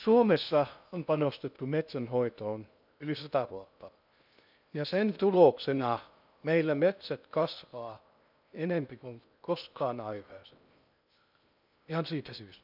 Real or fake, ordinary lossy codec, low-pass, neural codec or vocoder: fake; none; 5.4 kHz; codec, 16 kHz in and 24 kHz out, 0.6 kbps, FocalCodec, streaming, 2048 codes